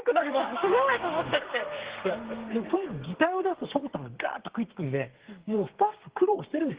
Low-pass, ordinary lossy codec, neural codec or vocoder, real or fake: 3.6 kHz; Opus, 16 kbps; codec, 32 kHz, 1.9 kbps, SNAC; fake